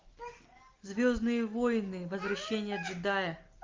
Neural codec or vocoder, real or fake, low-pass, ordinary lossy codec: none; real; 7.2 kHz; Opus, 24 kbps